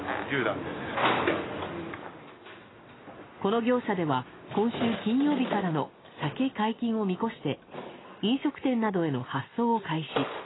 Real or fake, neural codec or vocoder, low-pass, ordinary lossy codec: real; none; 7.2 kHz; AAC, 16 kbps